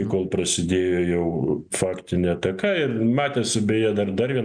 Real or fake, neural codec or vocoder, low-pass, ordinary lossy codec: real; none; 9.9 kHz; MP3, 96 kbps